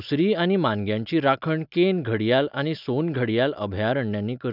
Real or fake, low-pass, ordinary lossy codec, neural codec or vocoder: real; 5.4 kHz; none; none